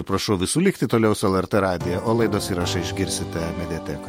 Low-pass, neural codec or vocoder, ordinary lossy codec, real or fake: 19.8 kHz; vocoder, 48 kHz, 128 mel bands, Vocos; MP3, 64 kbps; fake